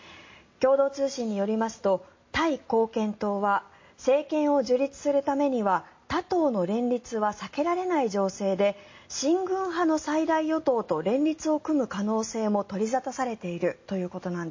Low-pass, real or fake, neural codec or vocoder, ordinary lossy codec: 7.2 kHz; real; none; MP3, 32 kbps